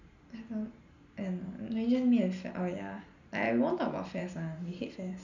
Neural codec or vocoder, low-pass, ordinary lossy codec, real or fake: none; 7.2 kHz; none; real